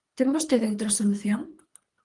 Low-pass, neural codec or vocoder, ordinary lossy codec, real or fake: 10.8 kHz; codec, 24 kHz, 3 kbps, HILCodec; Opus, 24 kbps; fake